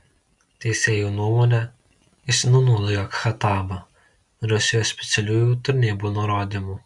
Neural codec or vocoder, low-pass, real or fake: none; 10.8 kHz; real